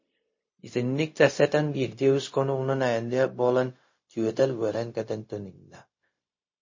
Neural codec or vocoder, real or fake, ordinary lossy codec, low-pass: codec, 16 kHz, 0.4 kbps, LongCat-Audio-Codec; fake; MP3, 32 kbps; 7.2 kHz